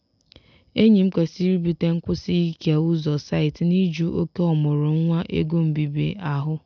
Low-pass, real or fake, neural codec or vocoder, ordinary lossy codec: 7.2 kHz; real; none; none